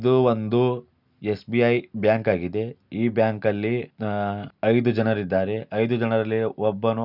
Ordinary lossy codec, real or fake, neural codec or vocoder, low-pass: none; real; none; 5.4 kHz